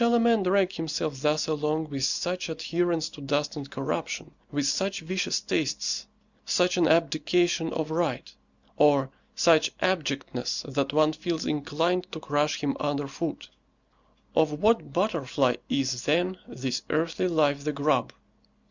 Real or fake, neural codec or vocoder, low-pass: real; none; 7.2 kHz